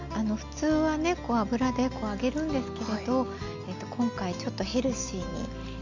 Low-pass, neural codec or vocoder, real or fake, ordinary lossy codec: 7.2 kHz; none; real; MP3, 64 kbps